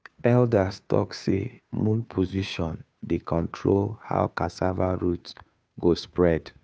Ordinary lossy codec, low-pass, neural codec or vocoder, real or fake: none; none; codec, 16 kHz, 2 kbps, FunCodec, trained on Chinese and English, 25 frames a second; fake